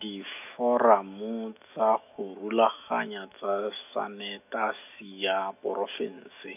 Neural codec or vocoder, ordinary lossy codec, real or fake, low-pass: autoencoder, 48 kHz, 128 numbers a frame, DAC-VAE, trained on Japanese speech; none; fake; 3.6 kHz